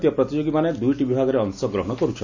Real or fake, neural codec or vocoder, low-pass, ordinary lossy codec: real; none; 7.2 kHz; AAC, 48 kbps